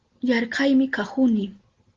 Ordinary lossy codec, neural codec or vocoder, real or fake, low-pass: Opus, 16 kbps; none; real; 7.2 kHz